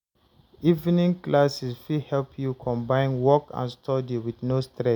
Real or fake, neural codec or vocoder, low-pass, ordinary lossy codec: real; none; 19.8 kHz; none